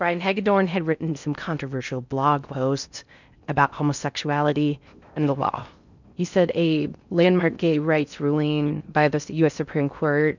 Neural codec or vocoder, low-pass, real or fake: codec, 16 kHz in and 24 kHz out, 0.6 kbps, FocalCodec, streaming, 4096 codes; 7.2 kHz; fake